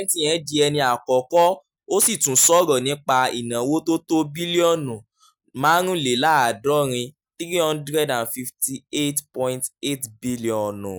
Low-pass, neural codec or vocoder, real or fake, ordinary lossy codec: none; none; real; none